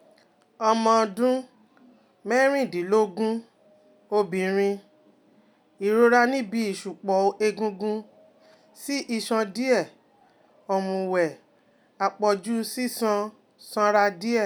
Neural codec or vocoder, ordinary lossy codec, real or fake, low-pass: none; none; real; none